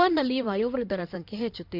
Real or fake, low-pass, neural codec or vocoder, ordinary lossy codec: fake; 5.4 kHz; codec, 16 kHz in and 24 kHz out, 2.2 kbps, FireRedTTS-2 codec; MP3, 48 kbps